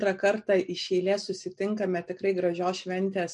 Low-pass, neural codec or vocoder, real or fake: 10.8 kHz; none; real